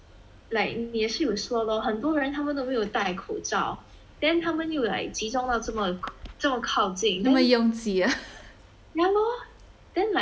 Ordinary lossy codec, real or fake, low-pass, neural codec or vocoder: none; real; none; none